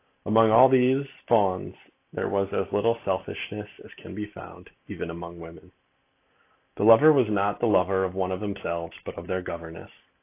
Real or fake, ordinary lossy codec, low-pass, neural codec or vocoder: real; AAC, 24 kbps; 3.6 kHz; none